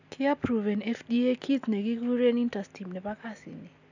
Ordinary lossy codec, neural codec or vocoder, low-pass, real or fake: none; none; 7.2 kHz; real